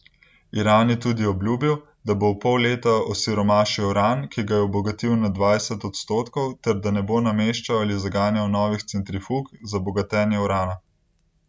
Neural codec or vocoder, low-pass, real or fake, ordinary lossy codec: none; none; real; none